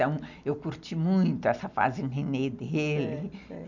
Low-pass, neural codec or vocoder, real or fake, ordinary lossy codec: 7.2 kHz; none; real; none